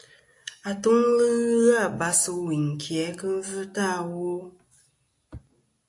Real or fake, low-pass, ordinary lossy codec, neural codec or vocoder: real; 10.8 kHz; AAC, 64 kbps; none